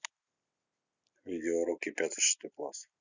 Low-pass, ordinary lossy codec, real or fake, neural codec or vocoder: 7.2 kHz; none; real; none